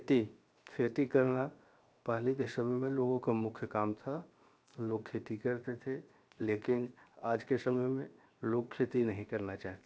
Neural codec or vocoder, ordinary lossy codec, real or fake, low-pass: codec, 16 kHz, 0.7 kbps, FocalCodec; none; fake; none